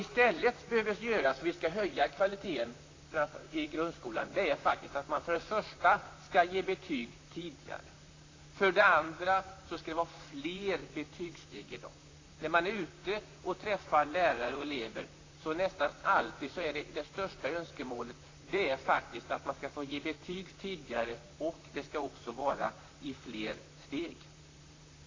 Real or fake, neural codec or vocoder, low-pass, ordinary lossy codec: fake; vocoder, 44.1 kHz, 128 mel bands, Pupu-Vocoder; 7.2 kHz; AAC, 32 kbps